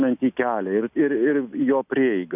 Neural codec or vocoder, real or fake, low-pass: none; real; 3.6 kHz